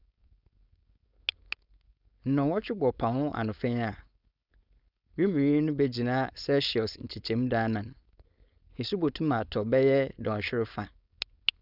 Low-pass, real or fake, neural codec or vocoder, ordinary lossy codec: 5.4 kHz; fake; codec, 16 kHz, 4.8 kbps, FACodec; Opus, 64 kbps